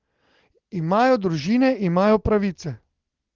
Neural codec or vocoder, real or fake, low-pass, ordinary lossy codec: none; real; 7.2 kHz; Opus, 16 kbps